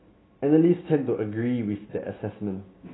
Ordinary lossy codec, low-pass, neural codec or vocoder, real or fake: AAC, 16 kbps; 7.2 kHz; none; real